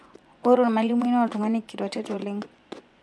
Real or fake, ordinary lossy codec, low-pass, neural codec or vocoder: fake; none; none; vocoder, 24 kHz, 100 mel bands, Vocos